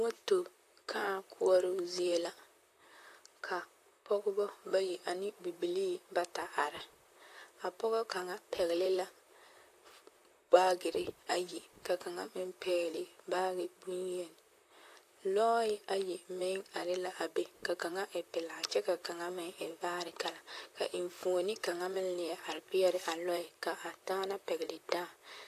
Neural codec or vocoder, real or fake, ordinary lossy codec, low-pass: vocoder, 44.1 kHz, 128 mel bands, Pupu-Vocoder; fake; AAC, 64 kbps; 14.4 kHz